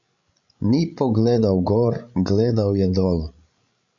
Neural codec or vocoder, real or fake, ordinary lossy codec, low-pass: codec, 16 kHz, 16 kbps, FreqCodec, larger model; fake; MP3, 64 kbps; 7.2 kHz